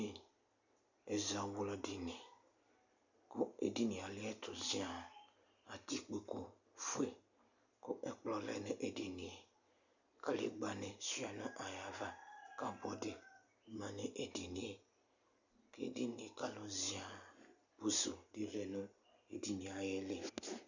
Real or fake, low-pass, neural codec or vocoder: real; 7.2 kHz; none